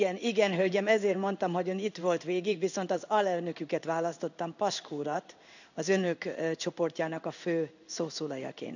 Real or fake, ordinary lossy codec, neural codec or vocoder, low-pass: fake; none; codec, 16 kHz in and 24 kHz out, 1 kbps, XY-Tokenizer; 7.2 kHz